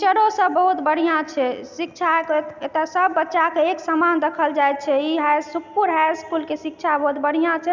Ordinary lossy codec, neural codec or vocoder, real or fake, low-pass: none; none; real; 7.2 kHz